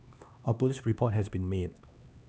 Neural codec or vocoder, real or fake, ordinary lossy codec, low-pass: codec, 16 kHz, 2 kbps, X-Codec, HuBERT features, trained on LibriSpeech; fake; none; none